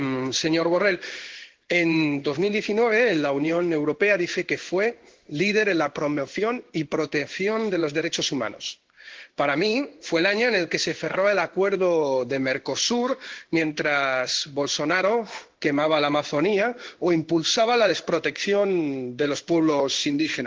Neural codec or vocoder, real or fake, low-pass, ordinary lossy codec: codec, 16 kHz in and 24 kHz out, 1 kbps, XY-Tokenizer; fake; 7.2 kHz; Opus, 16 kbps